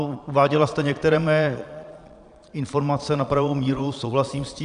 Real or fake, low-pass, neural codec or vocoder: fake; 9.9 kHz; vocoder, 22.05 kHz, 80 mel bands, Vocos